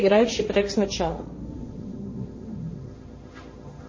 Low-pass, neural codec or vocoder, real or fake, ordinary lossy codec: 7.2 kHz; codec, 16 kHz, 1.1 kbps, Voila-Tokenizer; fake; MP3, 32 kbps